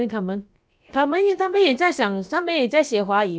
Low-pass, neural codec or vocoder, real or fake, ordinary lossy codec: none; codec, 16 kHz, about 1 kbps, DyCAST, with the encoder's durations; fake; none